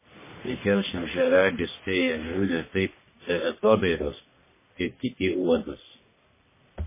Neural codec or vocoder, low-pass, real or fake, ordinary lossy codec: codec, 44.1 kHz, 1.7 kbps, Pupu-Codec; 3.6 kHz; fake; MP3, 16 kbps